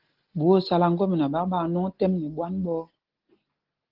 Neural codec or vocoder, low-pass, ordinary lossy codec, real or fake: none; 5.4 kHz; Opus, 16 kbps; real